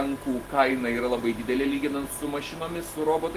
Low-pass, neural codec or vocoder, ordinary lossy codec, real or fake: 14.4 kHz; none; Opus, 16 kbps; real